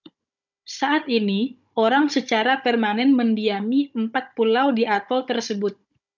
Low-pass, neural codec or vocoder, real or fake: 7.2 kHz; codec, 16 kHz, 16 kbps, FunCodec, trained on Chinese and English, 50 frames a second; fake